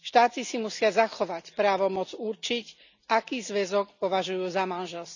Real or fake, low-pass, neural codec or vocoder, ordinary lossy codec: real; 7.2 kHz; none; none